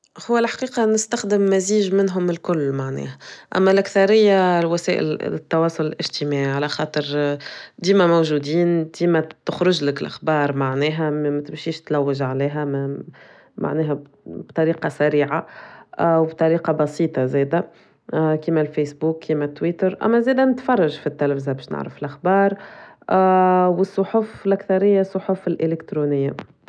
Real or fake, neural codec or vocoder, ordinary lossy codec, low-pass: real; none; none; none